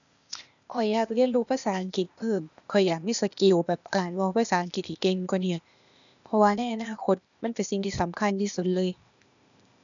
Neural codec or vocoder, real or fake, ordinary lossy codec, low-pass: codec, 16 kHz, 0.8 kbps, ZipCodec; fake; none; 7.2 kHz